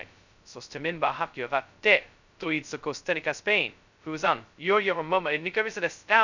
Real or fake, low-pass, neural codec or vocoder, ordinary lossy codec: fake; 7.2 kHz; codec, 16 kHz, 0.2 kbps, FocalCodec; none